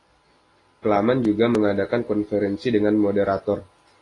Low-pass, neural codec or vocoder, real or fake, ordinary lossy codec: 10.8 kHz; none; real; AAC, 32 kbps